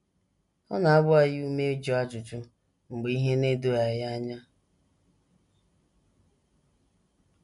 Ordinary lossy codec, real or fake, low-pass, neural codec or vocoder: none; real; 10.8 kHz; none